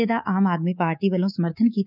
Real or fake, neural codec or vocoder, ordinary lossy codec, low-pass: fake; codec, 24 kHz, 3.1 kbps, DualCodec; none; 5.4 kHz